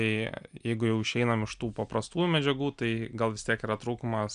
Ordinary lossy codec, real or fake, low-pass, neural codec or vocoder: MP3, 96 kbps; real; 9.9 kHz; none